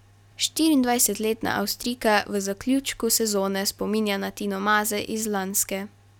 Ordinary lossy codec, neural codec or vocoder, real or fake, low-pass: none; none; real; 19.8 kHz